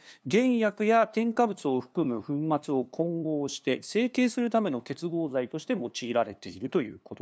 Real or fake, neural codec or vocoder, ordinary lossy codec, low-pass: fake; codec, 16 kHz, 2 kbps, FunCodec, trained on LibriTTS, 25 frames a second; none; none